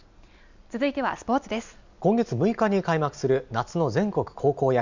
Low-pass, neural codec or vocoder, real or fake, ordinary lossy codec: 7.2 kHz; none; real; none